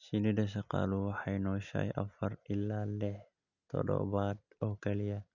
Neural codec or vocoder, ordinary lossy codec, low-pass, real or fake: none; none; 7.2 kHz; real